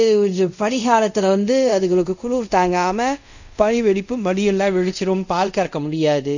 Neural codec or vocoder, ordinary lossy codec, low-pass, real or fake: codec, 24 kHz, 0.5 kbps, DualCodec; none; 7.2 kHz; fake